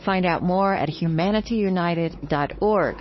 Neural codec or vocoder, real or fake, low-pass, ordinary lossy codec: codec, 16 kHz, 4 kbps, X-Codec, WavLM features, trained on Multilingual LibriSpeech; fake; 7.2 kHz; MP3, 24 kbps